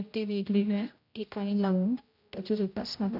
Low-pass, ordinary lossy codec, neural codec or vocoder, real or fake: 5.4 kHz; none; codec, 16 kHz, 0.5 kbps, X-Codec, HuBERT features, trained on general audio; fake